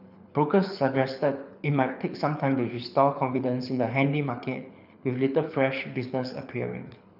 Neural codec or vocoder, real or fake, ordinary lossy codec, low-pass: codec, 24 kHz, 6 kbps, HILCodec; fake; none; 5.4 kHz